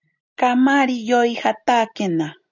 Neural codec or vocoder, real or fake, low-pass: none; real; 7.2 kHz